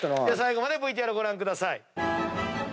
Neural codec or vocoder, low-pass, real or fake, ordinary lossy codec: none; none; real; none